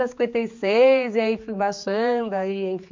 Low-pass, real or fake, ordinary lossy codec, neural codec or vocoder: 7.2 kHz; fake; MP3, 48 kbps; codec, 16 kHz, 4 kbps, X-Codec, HuBERT features, trained on general audio